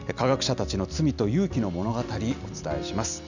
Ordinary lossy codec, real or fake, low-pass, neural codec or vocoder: none; real; 7.2 kHz; none